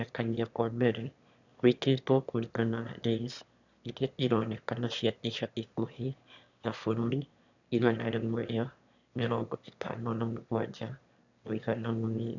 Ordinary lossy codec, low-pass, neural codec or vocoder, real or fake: none; 7.2 kHz; autoencoder, 22.05 kHz, a latent of 192 numbers a frame, VITS, trained on one speaker; fake